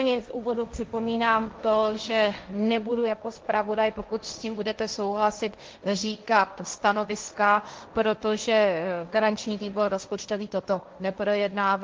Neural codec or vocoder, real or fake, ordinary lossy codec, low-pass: codec, 16 kHz, 1.1 kbps, Voila-Tokenizer; fake; Opus, 24 kbps; 7.2 kHz